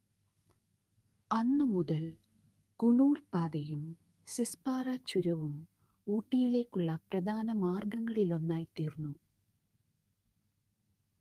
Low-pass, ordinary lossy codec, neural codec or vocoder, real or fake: 14.4 kHz; Opus, 32 kbps; codec, 32 kHz, 1.9 kbps, SNAC; fake